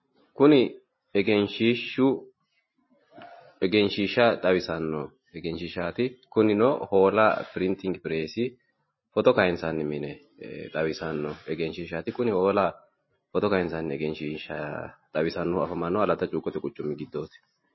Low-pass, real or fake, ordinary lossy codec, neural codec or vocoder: 7.2 kHz; real; MP3, 24 kbps; none